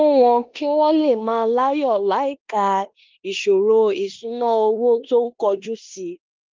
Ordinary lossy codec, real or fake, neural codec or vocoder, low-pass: Opus, 24 kbps; fake; codec, 16 kHz in and 24 kHz out, 0.9 kbps, LongCat-Audio-Codec, four codebook decoder; 7.2 kHz